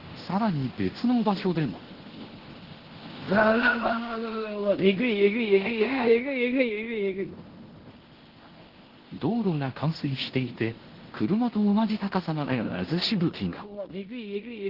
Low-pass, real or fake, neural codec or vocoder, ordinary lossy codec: 5.4 kHz; fake; codec, 16 kHz in and 24 kHz out, 0.9 kbps, LongCat-Audio-Codec, fine tuned four codebook decoder; Opus, 16 kbps